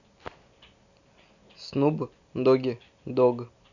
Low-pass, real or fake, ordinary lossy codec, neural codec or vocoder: 7.2 kHz; real; none; none